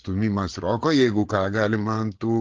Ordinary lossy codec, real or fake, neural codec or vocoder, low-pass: Opus, 16 kbps; fake; codec, 16 kHz, 8 kbps, FreqCodec, larger model; 7.2 kHz